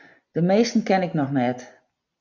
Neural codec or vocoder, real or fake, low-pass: none; real; 7.2 kHz